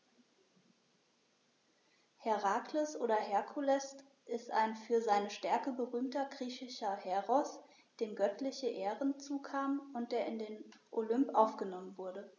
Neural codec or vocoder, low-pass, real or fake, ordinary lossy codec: none; 7.2 kHz; real; none